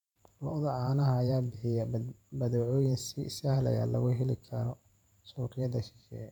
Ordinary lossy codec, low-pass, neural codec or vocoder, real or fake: none; 19.8 kHz; vocoder, 44.1 kHz, 128 mel bands every 512 samples, BigVGAN v2; fake